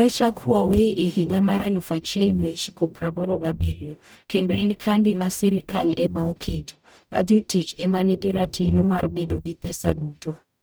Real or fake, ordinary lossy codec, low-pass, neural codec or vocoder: fake; none; none; codec, 44.1 kHz, 0.9 kbps, DAC